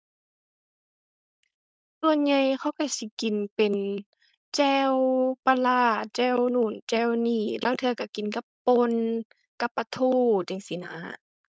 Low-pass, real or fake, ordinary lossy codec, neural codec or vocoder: none; fake; none; codec, 16 kHz, 4.8 kbps, FACodec